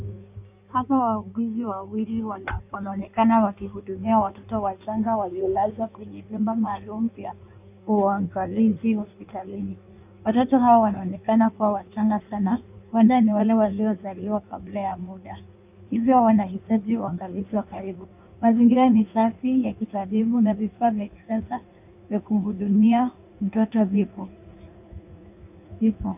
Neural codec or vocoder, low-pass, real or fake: codec, 16 kHz in and 24 kHz out, 1.1 kbps, FireRedTTS-2 codec; 3.6 kHz; fake